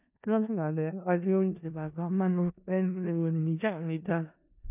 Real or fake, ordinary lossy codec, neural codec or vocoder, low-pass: fake; none; codec, 16 kHz in and 24 kHz out, 0.4 kbps, LongCat-Audio-Codec, four codebook decoder; 3.6 kHz